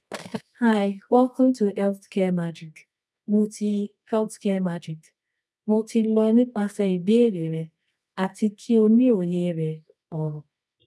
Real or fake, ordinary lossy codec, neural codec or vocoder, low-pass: fake; none; codec, 24 kHz, 0.9 kbps, WavTokenizer, medium music audio release; none